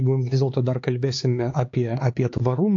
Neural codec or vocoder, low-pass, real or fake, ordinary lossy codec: codec, 16 kHz, 4 kbps, X-Codec, HuBERT features, trained on LibriSpeech; 7.2 kHz; fake; AAC, 48 kbps